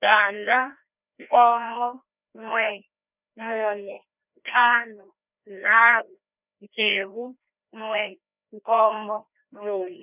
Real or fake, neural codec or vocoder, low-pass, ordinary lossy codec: fake; codec, 16 kHz, 1 kbps, FreqCodec, larger model; 3.6 kHz; none